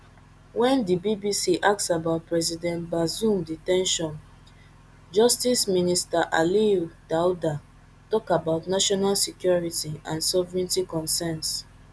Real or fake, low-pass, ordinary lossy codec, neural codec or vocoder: real; none; none; none